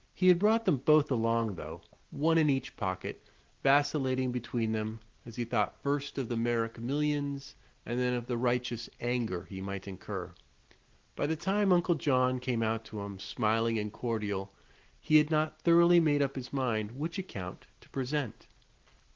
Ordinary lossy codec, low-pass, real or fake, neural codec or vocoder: Opus, 16 kbps; 7.2 kHz; real; none